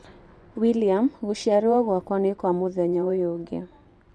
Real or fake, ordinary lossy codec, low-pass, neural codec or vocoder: fake; none; none; vocoder, 24 kHz, 100 mel bands, Vocos